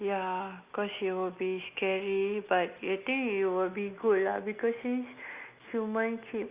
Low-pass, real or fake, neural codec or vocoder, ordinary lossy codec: 3.6 kHz; real; none; Opus, 64 kbps